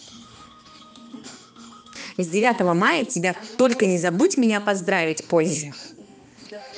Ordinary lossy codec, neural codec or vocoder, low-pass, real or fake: none; codec, 16 kHz, 2 kbps, X-Codec, HuBERT features, trained on balanced general audio; none; fake